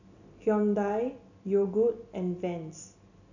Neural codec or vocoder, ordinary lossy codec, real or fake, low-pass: none; none; real; 7.2 kHz